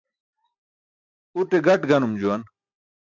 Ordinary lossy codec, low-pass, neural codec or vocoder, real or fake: AAC, 48 kbps; 7.2 kHz; none; real